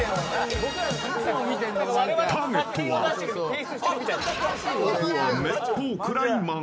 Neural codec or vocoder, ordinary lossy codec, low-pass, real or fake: none; none; none; real